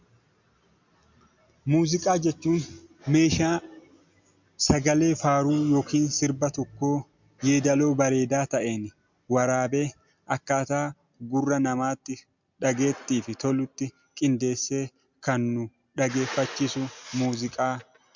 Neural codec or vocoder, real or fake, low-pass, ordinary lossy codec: none; real; 7.2 kHz; MP3, 64 kbps